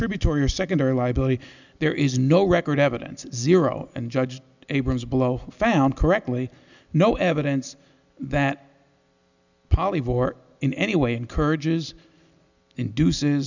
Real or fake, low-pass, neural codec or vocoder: real; 7.2 kHz; none